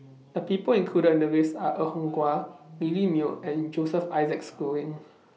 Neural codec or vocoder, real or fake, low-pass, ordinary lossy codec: none; real; none; none